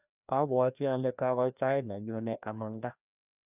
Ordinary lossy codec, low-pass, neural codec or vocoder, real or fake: none; 3.6 kHz; codec, 16 kHz, 1 kbps, FreqCodec, larger model; fake